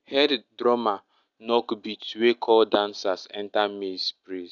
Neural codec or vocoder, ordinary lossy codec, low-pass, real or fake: none; none; 7.2 kHz; real